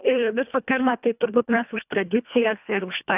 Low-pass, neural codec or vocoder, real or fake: 3.6 kHz; codec, 24 kHz, 1.5 kbps, HILCodec; fake